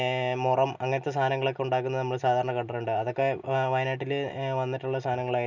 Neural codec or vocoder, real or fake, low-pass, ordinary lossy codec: none; real; 7.2 kHz; none